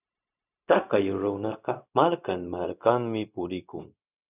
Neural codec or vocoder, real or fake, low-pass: codec, 16 kHz, 0.4 kbps, LongCat-Audio-Codec; fake; 3.6 kHz